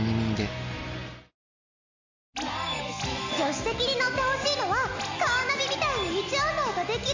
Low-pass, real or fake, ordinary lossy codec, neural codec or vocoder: 7.2 kHz; real; MP3, 64 kbps; none